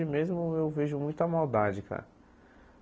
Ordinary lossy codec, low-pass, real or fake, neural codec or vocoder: none; none; real; none